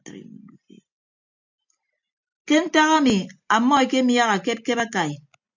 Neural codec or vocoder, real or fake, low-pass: none; real; 7.2 kHz